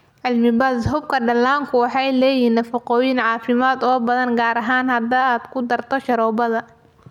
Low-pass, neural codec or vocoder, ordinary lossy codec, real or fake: 19.8 kHz; none; none; real